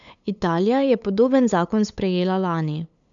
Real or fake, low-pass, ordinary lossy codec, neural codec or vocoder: fake; 7.2 kHz; none; codec, 16 kHz, 8 kbps, FunCodec, trained on LibriTTS, 25 frames a second